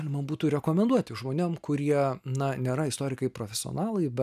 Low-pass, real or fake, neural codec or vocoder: 14.4 kHz; real; none